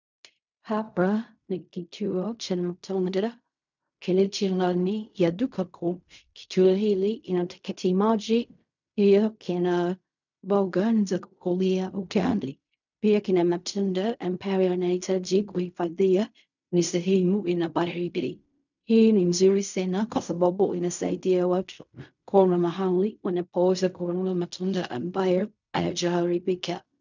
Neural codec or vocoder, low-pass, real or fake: codec, 16 kHz in and 24 kHz out, 0.4 kbps, LongCat-Audio-Codec, fine tuned four codebook decoder; 7.2 kHz; fake